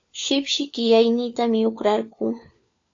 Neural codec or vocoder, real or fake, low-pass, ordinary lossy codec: codec, 16 kHz, 4 kbps, FunCodec, trained on LibriTTS, 50 frames a second; fake; 7.2 kHz; AAC, 64 kbps